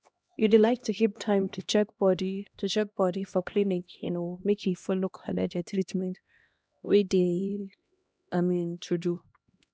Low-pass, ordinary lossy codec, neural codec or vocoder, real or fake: none; none; codec, 16 kHz, 1 kbps, X-Codec, HuBERT features, trained on LibriSpeech; fake